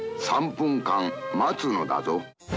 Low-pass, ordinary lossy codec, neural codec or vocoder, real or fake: none; none; none; real